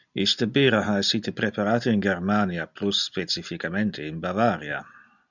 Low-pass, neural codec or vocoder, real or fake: 7.2 kHz; none; real